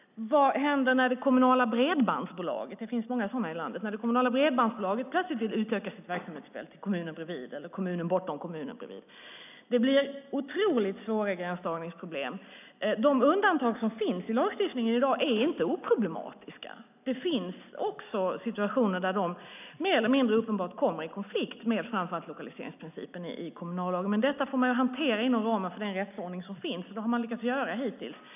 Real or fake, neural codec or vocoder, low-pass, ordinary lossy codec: real; none; 3.6 kHz; none